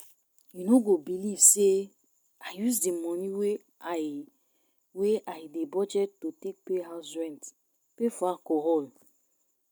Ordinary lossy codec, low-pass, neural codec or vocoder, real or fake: none; none; none; real